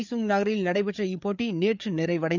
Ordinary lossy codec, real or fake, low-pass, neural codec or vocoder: none; fake; 7.2 kHz; codec, 16 kHz, 4 kbps, FreqCodec, larger model